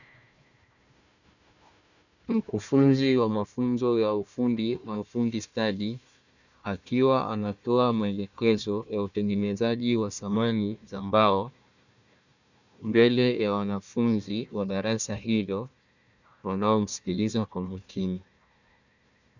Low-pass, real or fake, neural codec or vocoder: 7.2 kHz; fake; codec, 16 kHz, 1 kbps, FunCodec, trained on Chinese and English, 50 frames a second